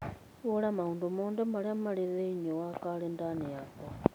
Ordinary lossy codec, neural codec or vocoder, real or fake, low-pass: none; none; real; none